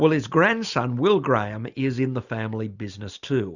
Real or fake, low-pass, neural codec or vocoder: real; 7.2 kHz; none